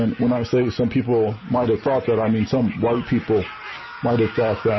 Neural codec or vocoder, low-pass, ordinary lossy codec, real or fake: none; 7.2 kHz; MP3, 24 kbps; real